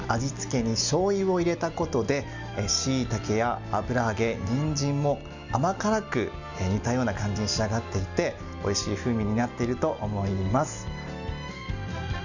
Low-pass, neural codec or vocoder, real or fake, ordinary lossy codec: 7.2 kHz; none; real; none